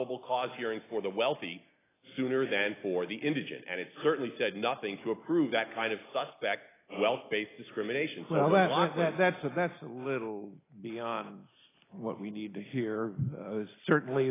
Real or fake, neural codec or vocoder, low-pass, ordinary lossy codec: real; none; 3.6 kHz; AAC, 16 kbps